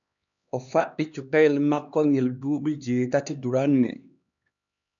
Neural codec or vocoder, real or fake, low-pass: codec, 16 kHz, 2 kbps, X-Codec, HuBERT features, trained on LibriSpeech; fake; 7.2 kHz